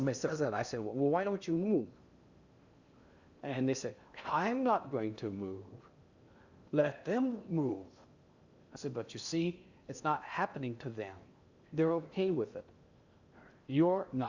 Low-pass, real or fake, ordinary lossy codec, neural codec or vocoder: 7.2 kHz; fake; Opus, 64 kbps; codec, 16 kHz in and 24 kHz out, 0.8 kbps, FocalCodec, streaming, 65536 codes